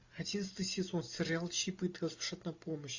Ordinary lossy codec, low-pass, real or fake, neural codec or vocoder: AAC, 48 kbps; 7.2 kHz; real; none